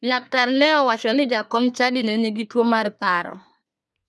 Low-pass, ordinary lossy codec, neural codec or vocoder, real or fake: none; none; codec, 24 kHz, 1 kbps, SNAC; fake